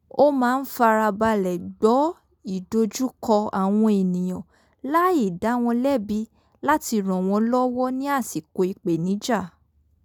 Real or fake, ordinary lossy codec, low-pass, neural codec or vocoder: real; none; 19.8 kHz; none